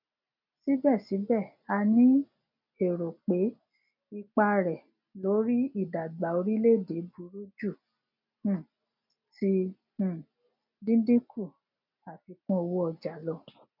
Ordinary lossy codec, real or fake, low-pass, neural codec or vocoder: none; real; 5.4 kHz; none